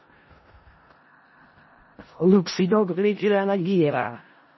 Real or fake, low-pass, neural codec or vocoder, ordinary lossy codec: fake; 7.2 kHz; codec, 16 kHz in and 24 kHz out, 0.4 kbps, LongCat-Audio-Codec, four codebook decoder; MP3, 24 kbps